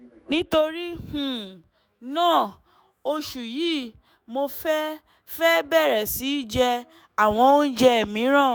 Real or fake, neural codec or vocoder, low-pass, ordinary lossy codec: fake; autoencoder, 48 kHz, 128 numbers a frame, DAC-VAE, trained on Japanese speech; none; none